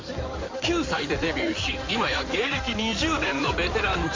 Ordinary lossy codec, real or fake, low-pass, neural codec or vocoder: MP3, 48 kbps; fake; 7.2 kHz; codec, 16 kHz in and 24 kHz out, 2.2 kbps, FireRedTTS-2 codec